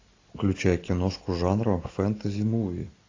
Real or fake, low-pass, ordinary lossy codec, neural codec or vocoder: real; 7.2 kHz; AAC, 32 kbps; none